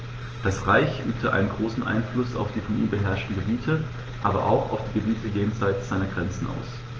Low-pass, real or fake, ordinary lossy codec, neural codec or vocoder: 7.2 kHz; real; Opus, 16 kbps; none